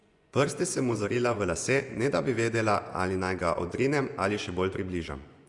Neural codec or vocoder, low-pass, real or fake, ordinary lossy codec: vocoder, 24 kHz, 100 mel bands, Vocos; 10.8 kHz; fake; Opus, 64 kbps